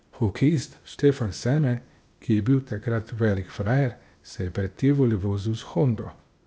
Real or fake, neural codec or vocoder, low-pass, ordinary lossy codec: fake; codec, 16 kHz, 0.8 kbps, ZipCodec; none; none